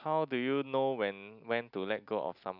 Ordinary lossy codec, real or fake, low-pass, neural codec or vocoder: none; real; 5.4 kHz; none